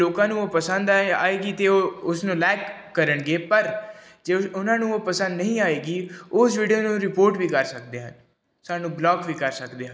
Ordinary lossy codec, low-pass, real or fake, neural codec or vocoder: none; none; real; none